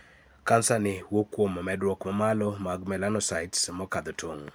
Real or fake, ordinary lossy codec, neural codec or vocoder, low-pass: real; none; none; none